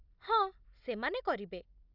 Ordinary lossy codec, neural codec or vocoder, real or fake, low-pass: none; none; real; 5.4 kHz